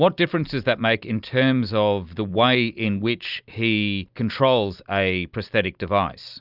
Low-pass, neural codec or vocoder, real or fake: 5.4 kHz; none; real